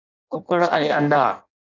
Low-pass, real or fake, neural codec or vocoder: 7.2 kHz; fake; codec, 16 kHz in and 24 kHz out, 0.6 kbps, FireRedTTS-2 codec